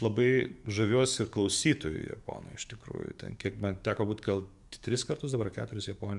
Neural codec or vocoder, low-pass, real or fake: codec, 44.1 kHz, 7.8 kbps, DAC; 10.8 kHz; fake